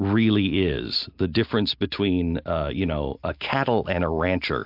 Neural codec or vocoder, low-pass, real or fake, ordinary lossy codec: none; 5.4 kHz; real; MP3, 48 kbps